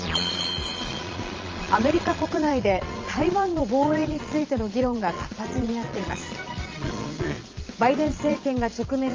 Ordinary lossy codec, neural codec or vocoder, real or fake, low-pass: Opus, 24 kbps; vocoder, 22.05 kHz, 80 mel bands, Vocos; fake; 7.2 kHz